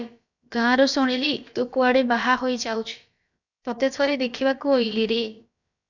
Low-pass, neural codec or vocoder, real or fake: 7.2 kHz; codec, 16 kHz, about 1 kbps, DyCAST, with the encoder's durations; fake